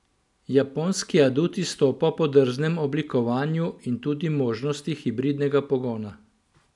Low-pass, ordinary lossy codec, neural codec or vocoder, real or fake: 10.8 kHz; none; none; real